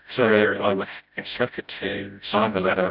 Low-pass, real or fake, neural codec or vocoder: 5.4 kHz; fake; codec, 16 kHz, 0.5 kbps, FreqCodec, smaller model